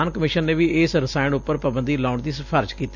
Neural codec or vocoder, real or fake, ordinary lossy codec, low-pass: none; real; none; 7.2 kHz